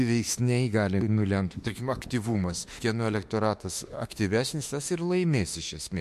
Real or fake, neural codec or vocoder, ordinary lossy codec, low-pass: fake; autoencoder, 48 kHz, 32 numbers a frame, DAC-VAE, trained on Japanese speech; MP3, 64 kbps; 14.4 kHz